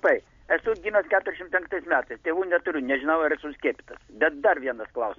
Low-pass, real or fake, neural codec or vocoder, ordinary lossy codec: 7.2 kHz; real; none; MP3, 48 kbps